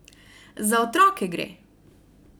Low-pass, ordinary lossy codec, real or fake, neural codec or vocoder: none; none; real; none